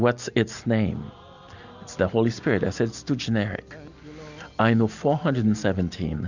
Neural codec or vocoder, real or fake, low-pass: none; real; 7.2 kHz